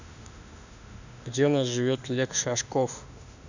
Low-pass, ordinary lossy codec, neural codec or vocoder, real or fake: 7.2 kHz; none; autoencoder, 48 kHz, 32 numbers a frame, DAC-VAE, trained on Japanese speech; fake